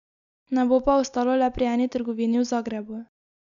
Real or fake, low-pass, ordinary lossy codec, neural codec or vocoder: real; 7.2 kHz; none; none